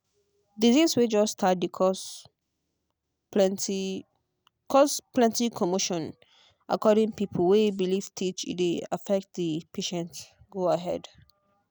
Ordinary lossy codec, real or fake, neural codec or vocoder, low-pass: none; real; none; none